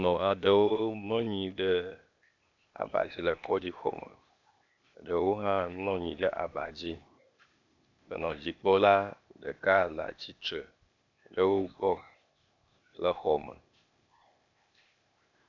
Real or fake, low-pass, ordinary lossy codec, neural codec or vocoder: fake; 7.2 kHz; MP3, 64 kbps; codec, 16 kHz, 0.8 kbps, ZipCodec